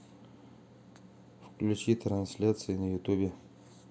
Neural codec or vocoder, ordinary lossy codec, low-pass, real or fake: none; none; none; real